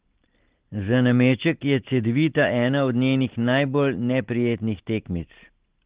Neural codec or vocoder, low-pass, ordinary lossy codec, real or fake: none; 3.6 kHz; Opus, 16 kbps; real